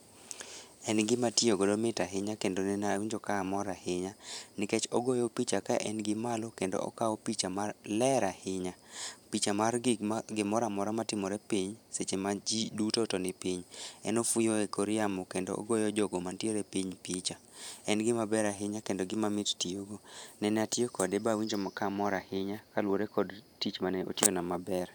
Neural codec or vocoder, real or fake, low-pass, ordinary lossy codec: vocoder, 44.1 kHz, 128 mel bands every 512 samples, BigVGAN v2; fake; none; none